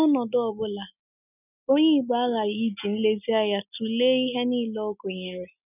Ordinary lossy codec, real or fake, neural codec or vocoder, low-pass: none; real; none; 3.6 kHz